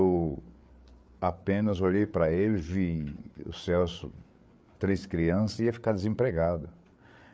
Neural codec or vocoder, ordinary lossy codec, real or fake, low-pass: codec, 16 kHz, 8 kbps, FreqCodec, larger model; none; fake; none